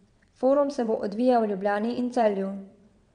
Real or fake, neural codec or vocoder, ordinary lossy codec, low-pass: fake; vocoder, 22.05 kHz, 80 mel bands, WaveNeXt; none; 9.9 kHz